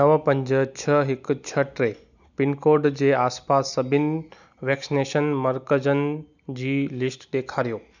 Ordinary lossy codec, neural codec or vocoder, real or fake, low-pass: none; none; real; 7.2 kHz